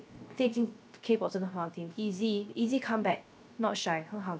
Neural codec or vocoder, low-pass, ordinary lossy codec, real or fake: codec, 16 kHz, 0.3 kbps, FocalCodec; none; none; fake